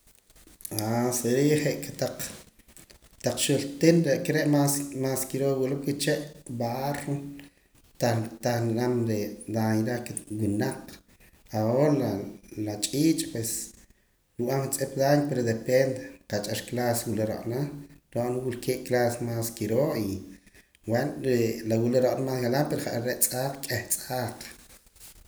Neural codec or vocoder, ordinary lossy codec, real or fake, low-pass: none; none; real; none